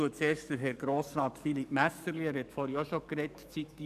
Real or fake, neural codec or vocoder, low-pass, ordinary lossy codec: fake; codec, 44.1 kHz, 7.8 kbps, DAC; 14.4 kHz; none